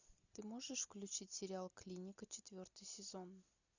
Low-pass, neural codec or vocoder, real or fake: 7.2 kHz; none; real